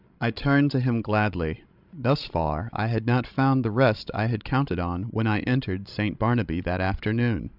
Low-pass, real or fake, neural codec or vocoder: 5.4 kHz; fake; codec, 16 kHz, 16 kbps, FreqCodec, larger model